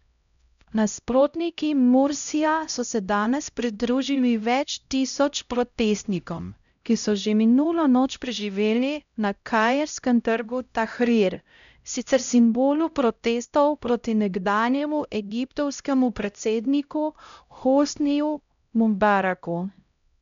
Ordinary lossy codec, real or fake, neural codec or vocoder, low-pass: MP3, 96 kbps; fake; codec, 16 kHz, 0.5 kbps, X-Codec, HuBERT features, trained on LibriSpeech; 7.2 kHz